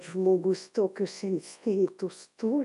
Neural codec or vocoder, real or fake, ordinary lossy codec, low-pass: codec, 24 kHz, 0.9 kbps, WavTokenizer, large speech release; fake; AAC, 96 kbps; 10.8 kHz